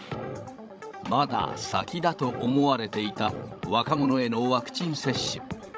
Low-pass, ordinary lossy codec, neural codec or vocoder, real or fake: none; none; codec, 16 kHz, 16 kbps, FreqCodec, larger model; fake